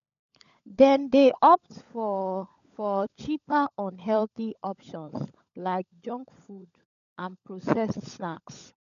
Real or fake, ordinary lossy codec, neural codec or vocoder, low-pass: fake; none; codec, 16 kHz, 16 kbps, FunCodec, trained on LibriTTS, 50 frames a second; 7.2 kHz